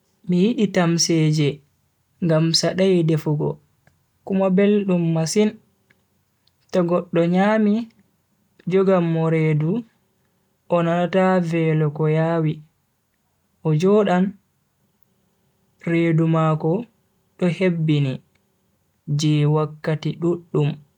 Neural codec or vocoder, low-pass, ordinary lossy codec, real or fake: none; 19.8 kHz; none; real